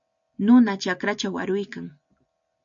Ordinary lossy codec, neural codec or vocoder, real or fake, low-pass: MP3, 64 kbps; none; real; 7.2 kHz